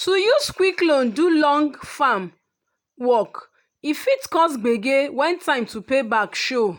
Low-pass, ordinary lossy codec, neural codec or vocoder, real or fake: 19.8 kHz; none; none; real